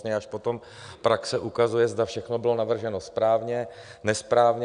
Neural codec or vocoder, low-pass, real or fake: none; 9.9 kHz; real